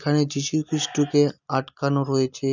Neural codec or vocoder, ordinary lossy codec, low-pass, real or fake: none; none; 7.2 kHz; real